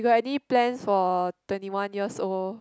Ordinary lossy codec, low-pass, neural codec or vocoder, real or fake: none; none; none; real